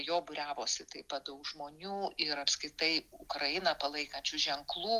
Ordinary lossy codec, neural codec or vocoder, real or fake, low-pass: Opus, 32 kbps; none; real; 14.4 kHz